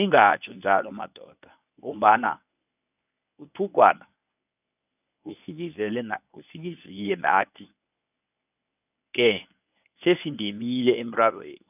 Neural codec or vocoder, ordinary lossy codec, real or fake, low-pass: codec, 24 kHz, 0.9 kbps, WavTokenizer, medium speech release version 1; none; fake; 3.6 kHz